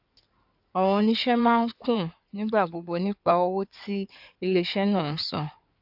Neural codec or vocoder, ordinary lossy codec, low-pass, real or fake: codec, 16 kHz in and 24 kHz out, 2.2 kbps, FireRedTTS-2 codec; AAC, 48 kbps; 5.4 kHz; fake